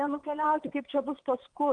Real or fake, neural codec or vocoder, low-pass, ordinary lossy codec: fake; vocoder, 22.05 kHz, 80 mel bands, WaveNeXt; 9.9 kHz; Opus, 24 kbps